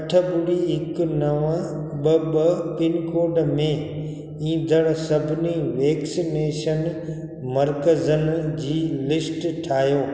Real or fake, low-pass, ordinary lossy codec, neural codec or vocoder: real; none; none; none